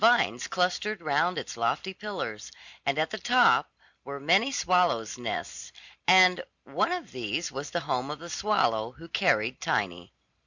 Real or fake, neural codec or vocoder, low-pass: real; none; 7.2 kHz